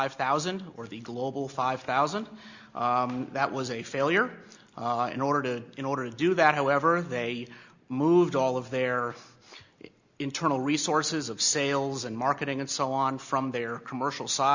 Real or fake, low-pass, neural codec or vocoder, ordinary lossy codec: real; 7.2 kHz; none; Opus, 64 kbps